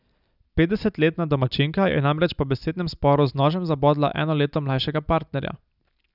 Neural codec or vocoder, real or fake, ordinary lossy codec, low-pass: none; real; none; 5.4 kHz